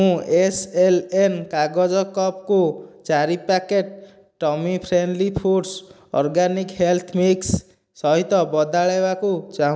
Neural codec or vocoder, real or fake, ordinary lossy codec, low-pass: none; real; none; none